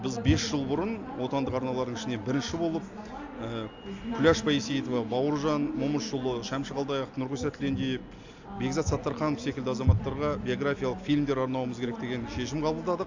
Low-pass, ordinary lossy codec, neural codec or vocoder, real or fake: 7.2 kHz; AAC, 48 kbps; none; real